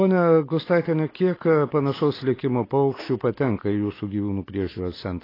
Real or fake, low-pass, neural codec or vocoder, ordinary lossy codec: real; 5.4 kHz; none; AAC, 24 kbps